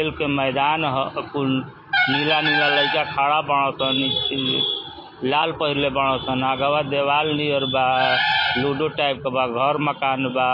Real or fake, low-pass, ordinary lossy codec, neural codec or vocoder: real; 5.4 kHz; MP3, 24 kbps; none